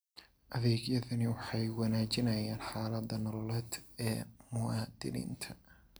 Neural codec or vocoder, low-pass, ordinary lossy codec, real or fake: none; none; none; real